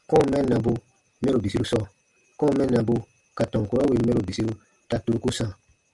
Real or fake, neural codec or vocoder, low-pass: real; none; 10.8 kHz